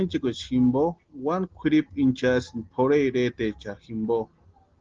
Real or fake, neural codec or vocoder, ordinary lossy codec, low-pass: real; none; Opus, 16 kbps; 7.2 kHz